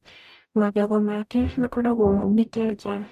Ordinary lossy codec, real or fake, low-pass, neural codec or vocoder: none; fake; 14.4 kHz; codec, 44.1 kHz, 0.9 kbps, DAC